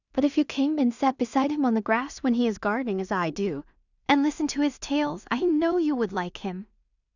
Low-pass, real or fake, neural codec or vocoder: 7.2 kHz; fake; codec, 16 kHz in and 24 kHz out, 0.4 kbps, LongCat-Audio-Codec, two codebook decoder